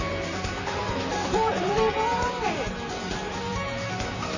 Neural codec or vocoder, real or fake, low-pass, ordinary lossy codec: vocoder, 44.1 kHz, 128 mel bands, Pupu-Vocoder; fake; 7.2 kHz; none